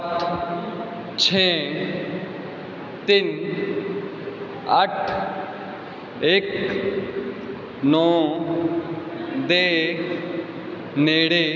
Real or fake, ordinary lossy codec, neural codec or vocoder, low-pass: real; none; none; 7.2 kHz